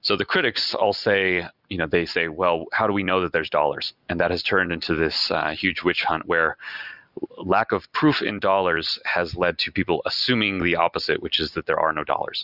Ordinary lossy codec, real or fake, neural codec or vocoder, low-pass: Opus, 64 kbps; real; none; 5.4 kHz